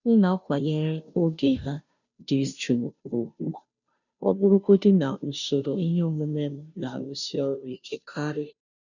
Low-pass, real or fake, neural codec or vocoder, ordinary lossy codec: 7.2 kHz; fake; codec, 16 kHz, 0.5 kbps, FunCodec, trained on Chinese and English, 25 frames a second; none